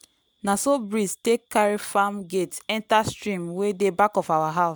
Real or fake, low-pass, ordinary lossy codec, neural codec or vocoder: real; none; none; none